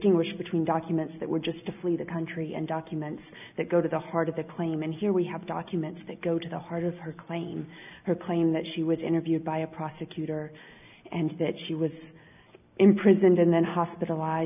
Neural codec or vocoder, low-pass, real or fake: none; 3.6 kHz; real